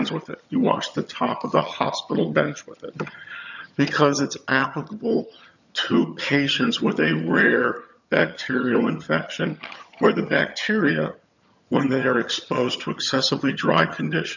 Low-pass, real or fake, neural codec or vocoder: 7.2 kHz; fake; vocoder, 22.05 kHz, 80 mel bands, HiFi-GAN